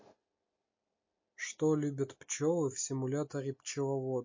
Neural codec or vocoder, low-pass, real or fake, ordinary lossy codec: none; 7.2 kHz; real; MP3, 32 kbps